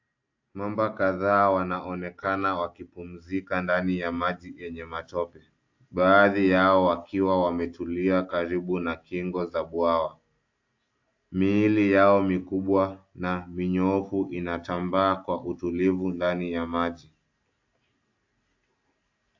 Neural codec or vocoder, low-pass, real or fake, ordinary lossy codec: none; 7.2 kHz; real; AAC, 48 kbps